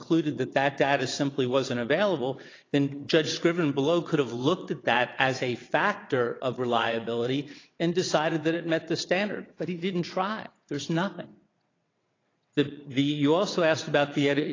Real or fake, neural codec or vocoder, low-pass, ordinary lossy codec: fake; vocoder, 22.05 kHz, 80 mel bands, WaveNeXt; 7.2 kHz; AAC, 32 kbps